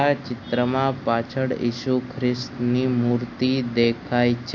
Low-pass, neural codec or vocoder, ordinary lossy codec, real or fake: 7.2 kHz; none; AAC, 48 kbps; real